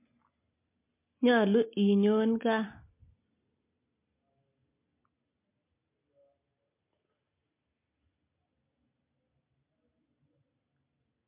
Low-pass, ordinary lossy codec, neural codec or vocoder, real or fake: 3.6 kHz; MP3, 24 kbps; none; real